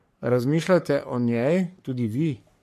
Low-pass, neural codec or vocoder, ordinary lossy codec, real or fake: 14.4 kHz; codec, 44.1 kHz, 3.4 kbps, Pupu-Codec; MP3, 64 kbps; fake